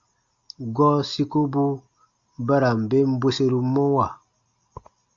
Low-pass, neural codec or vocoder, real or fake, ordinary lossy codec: 7.2 kHz; none; real; Opus, 64 kbps